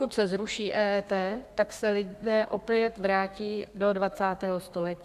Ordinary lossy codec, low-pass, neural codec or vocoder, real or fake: Opus, 64 kbps; 14.4 kHz; codec, 32 kHz, 1.9 kbps, SNAC; fake